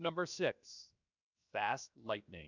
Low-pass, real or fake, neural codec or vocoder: 7.2 kHz; fake; codec, 16 kHz, 0.7 kbps, FocalCodec